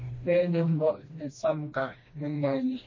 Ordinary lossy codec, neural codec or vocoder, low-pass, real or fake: MP3, 32 kbps; codec, 16 kHz, 1 kbps, FreqCodec, smaller model; 7.2 kHz; fake